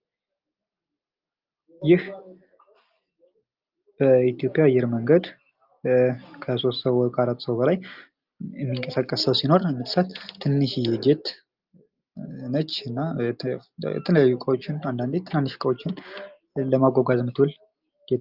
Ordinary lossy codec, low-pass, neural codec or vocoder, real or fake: Opus, 24 kbps; 5.4 kHz; none; real